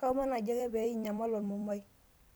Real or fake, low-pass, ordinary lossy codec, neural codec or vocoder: fake; none; none; vocoder, 44.1 kHz, 128 mel bands every 256 samples, BigVGAN v2